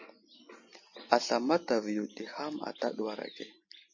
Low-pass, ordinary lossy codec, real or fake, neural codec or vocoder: 7.2 kHz; MP3, 32 kbps; real; none